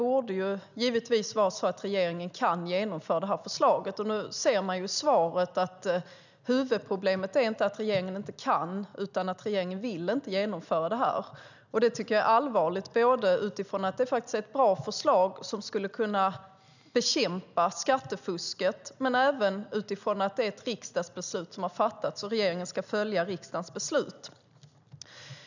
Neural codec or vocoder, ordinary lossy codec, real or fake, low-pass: none; none; real; 7.2 kHz